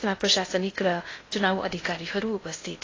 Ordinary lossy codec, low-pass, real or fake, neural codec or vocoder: AAC, 32 kbps; 7.2 kHz; fake; codec, 16 kHz in and 24 kHz out, 0.6 kbps, FocalCodec, streaming, 2048 codes